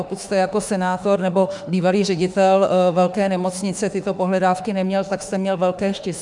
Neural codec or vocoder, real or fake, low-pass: autoencoder, 48 kHz, 32 numbers a frame, DAC-VAE, trained on Japanese speech; fake; 10.8 kHz